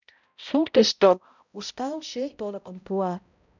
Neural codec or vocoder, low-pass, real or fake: codec, 16 kHz, 0.5 kbps, X-Codec, HuBERT features, trained on balanced general audio; 7.2 kHz; fake